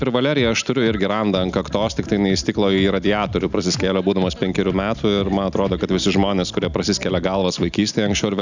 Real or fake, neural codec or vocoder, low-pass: real; none; 7.2 kHz